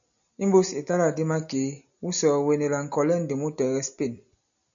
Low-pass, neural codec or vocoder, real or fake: 7.2 kHz; none; real